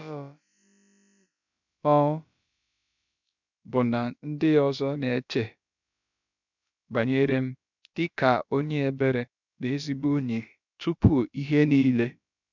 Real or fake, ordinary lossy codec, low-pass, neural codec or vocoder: fake; none; 7.2 kHz; codec, 16 kHz, about 1 kbps, DyCAST, with the encoder's durations